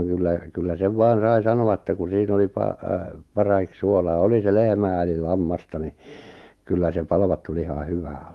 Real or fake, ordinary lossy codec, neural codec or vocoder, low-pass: fake; Opus, 24 kbps; autoencoder, 48 kHz, 128 numbers a frame, DAC-VAE, trained on Japanese speech; 19.8 kHz